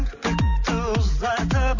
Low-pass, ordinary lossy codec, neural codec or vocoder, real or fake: 7.2 kHz; none; none; real